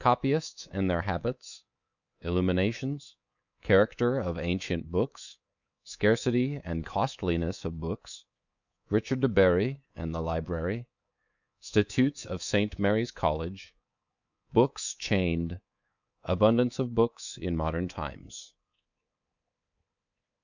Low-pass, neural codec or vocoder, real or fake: 7.2 kHz; codec, 24 kHz, 3.1 kbps, DualCodec; fake